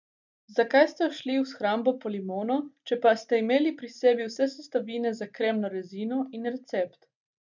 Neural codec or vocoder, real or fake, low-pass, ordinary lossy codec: none; real; 7.2 kHz; none